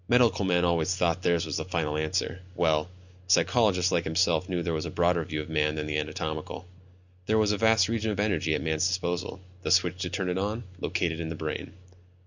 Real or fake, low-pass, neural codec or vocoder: real; 7.2 kHz; none